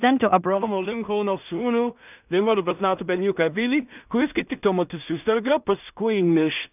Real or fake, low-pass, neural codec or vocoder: fake; 3.6 kHz; codec, 16 kHz in and 24 kHz out, 0.4 kbps, LongCat-Audio-Codec, two codebook decoder